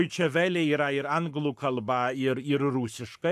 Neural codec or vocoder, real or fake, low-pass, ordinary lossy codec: codec, 44.1 kHz, 7.8 kbps, Pupu-Codec; fake; 14.4 kHz; AAC, 96 kbps